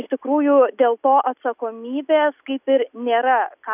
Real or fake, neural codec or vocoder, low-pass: real; none; 3.6 kHz